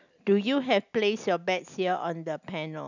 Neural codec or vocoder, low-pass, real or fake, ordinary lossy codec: none; 7.2 kHz; real; none